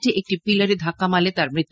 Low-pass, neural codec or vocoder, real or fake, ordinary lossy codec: none; none; real; none